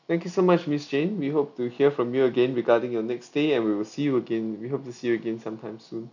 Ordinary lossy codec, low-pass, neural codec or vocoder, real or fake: none; 7.2 kHz; none; real